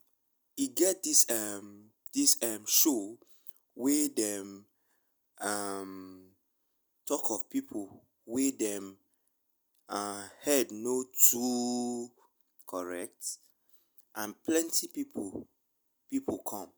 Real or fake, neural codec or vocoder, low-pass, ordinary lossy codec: real; none; none; none